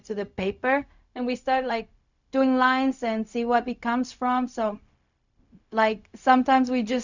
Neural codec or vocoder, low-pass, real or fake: codec, 16 kHz, 0.4 kbps, LongCat-Audio-Codec; 7.2 kHz; fake